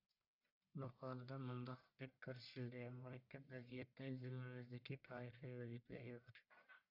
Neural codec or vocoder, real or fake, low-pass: codec, 44.1 kHz, 1.7 kbps, Pupu-Codec; fake; 5.4 kHz